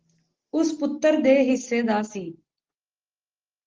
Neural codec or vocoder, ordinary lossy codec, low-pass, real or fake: none; Opus, 16 kbps; 7.2 kHz; real